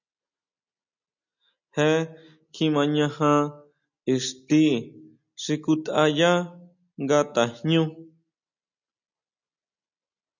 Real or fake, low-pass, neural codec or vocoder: real; 7.2 kHz; none